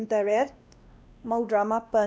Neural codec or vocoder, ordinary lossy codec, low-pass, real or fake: codec, 16 kHz, 1 kbps, X-Codec, WavLM features, trained on Multilingual LibriSpeech; none; none; fake